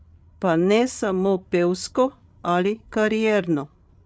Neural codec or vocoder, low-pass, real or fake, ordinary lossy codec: codec, 16 kHz, 16 kbps, FreqCodec, larger model; none; fake; none